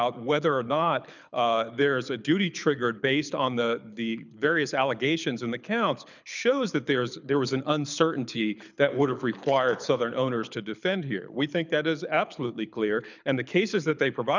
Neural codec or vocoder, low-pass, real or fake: codec, 24 kHz, 6 kbps, HILCodec; 7.2 kHz; fake